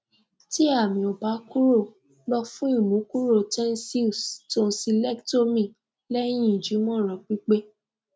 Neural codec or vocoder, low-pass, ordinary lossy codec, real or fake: none; none; none; real